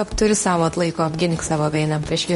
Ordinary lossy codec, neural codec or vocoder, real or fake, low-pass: MP3, 48 kbps; codec, 24 kHz, 0.9 kbps, WavTokenizer, medium speech release version 2; fake; 10.8 kHz